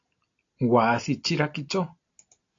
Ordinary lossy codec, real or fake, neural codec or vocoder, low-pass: AAC, 48 kbps; real; none; 7.2 kHz